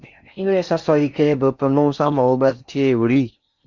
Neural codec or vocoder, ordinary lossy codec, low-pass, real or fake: codec, 16 kHz in and 24 kHz out, 0.6 kbps, FocalCodec, streaming, 4096 codes; Opus, 64 kbps; 7.2 kHz; fake